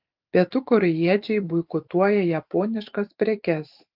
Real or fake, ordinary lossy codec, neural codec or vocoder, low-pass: fake; Opus, 32 kbps; vocoder, 44.1 kHz, 128 mel bands every 512 samples, BigVGAN v2; 5.4 kHz